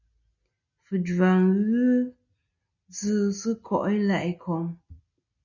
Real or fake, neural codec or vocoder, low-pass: real; none; 7.2 kHz